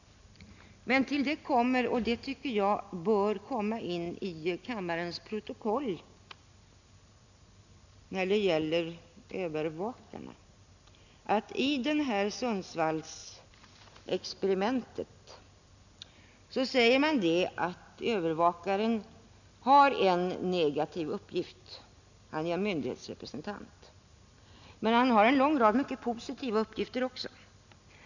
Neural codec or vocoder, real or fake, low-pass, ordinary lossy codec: none; real; 7.2 kHz; none